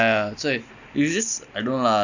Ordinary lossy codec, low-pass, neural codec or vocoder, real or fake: none; 7.2 kHz; none; real